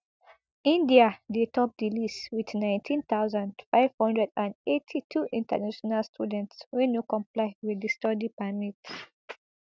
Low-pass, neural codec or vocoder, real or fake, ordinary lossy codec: none; none; real; none